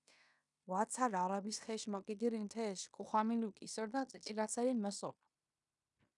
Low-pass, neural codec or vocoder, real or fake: 10.8 kHz; codec, 16 kHz in and 24 kHz out, 0.9 kbps, LongCat-Audio-Codec, fine tuned four codebook decoder; fake